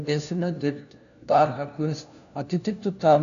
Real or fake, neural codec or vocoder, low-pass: fake; codec, 16 kHz, 1 kbps, FunCodec, trained on LibriTTS, 50 frames a second; 7.2 kHz